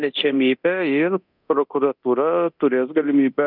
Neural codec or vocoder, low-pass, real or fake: codec, 24 kHz, 0.9 kbps, DualCodec; 5.4 kHz; fake